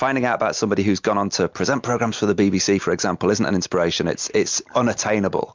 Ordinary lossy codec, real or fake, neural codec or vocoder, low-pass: MP3, 64 kbps; real; none; 7.2 kHz